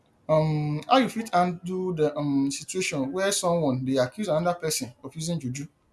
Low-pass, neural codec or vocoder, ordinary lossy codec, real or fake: none; none; none; real